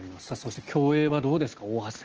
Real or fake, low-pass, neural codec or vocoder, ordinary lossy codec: real; 7.2 kHz; none; Opus, 16 kbps